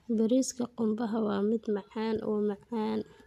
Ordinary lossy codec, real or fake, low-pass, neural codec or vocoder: none; real; 14.4 kHz; none